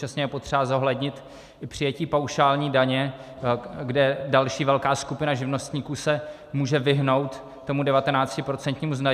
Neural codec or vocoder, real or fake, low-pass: none; real; 14.4 kHz